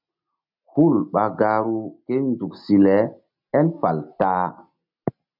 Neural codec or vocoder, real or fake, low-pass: none; real; 5.4 kHz